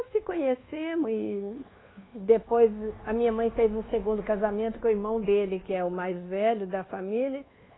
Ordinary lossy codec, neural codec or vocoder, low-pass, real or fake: AAC, 16 kbps; codec, 24 kHz, 1.2 kbps, DualCodec; 7.2 kHz; fake